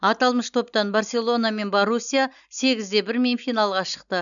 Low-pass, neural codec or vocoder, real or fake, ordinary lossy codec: 7.2 kHz; none; real; MP3, 96 kbps